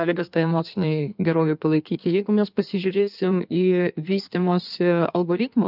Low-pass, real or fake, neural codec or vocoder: 5.4 kHz; fake; codec, 16 kHz in and 24 kHz out, 1.1 kbps, FireRedTTS-2 codec